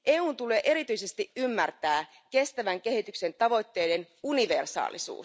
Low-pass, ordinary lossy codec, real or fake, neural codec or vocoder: none; none; real; none